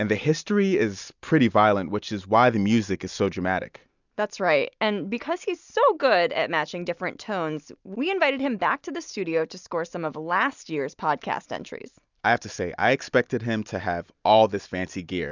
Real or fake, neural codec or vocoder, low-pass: real; none; 7.2 kHz